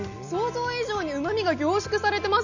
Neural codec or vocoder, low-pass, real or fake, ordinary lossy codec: none; 7.2 kHz; real; none